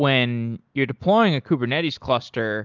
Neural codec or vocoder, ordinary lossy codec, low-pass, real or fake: none; Opus, 24 kbps; 7.2 kHz; real